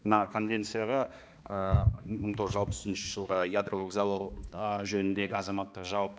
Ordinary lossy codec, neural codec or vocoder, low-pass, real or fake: none; codec, 16 kHz, 2 kbps, X-Codec, HuBERT features, trained on balanced general audio; none; fake